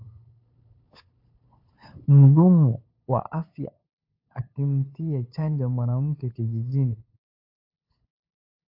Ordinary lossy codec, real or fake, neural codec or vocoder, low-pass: AAC, 32 kbps; fake; codec, 16 kHz, 8 kbps, FunCodec, trained on LibriTTS, 25 frames a second; 5.4 kHz